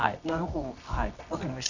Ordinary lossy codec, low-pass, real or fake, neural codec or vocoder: none; 7.2 kHz; fake; codec, 24 kHz, 0.9 kbps, WavTokenizer, medium music audio release